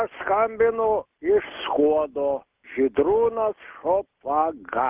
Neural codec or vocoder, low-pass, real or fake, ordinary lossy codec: none; 3.6 kHz; real; Opus, 24 kbps